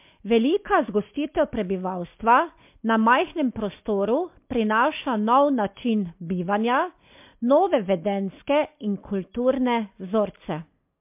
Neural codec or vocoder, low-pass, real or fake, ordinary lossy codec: none; 3.6 kHz; real; MP3, 32 kbps